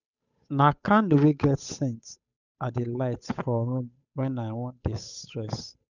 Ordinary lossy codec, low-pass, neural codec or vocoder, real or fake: none; 7.2 kHz; codec, 16 kHz, 8 kbps, FunCodec, trained on Chinese and English, 25 frames a second; fake